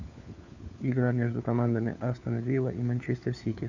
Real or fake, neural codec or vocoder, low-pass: fake; codec, 16 kHz, 4 kbps, FunCodec, trained on LibriTTS, 50 frames a second; 7.2 kHz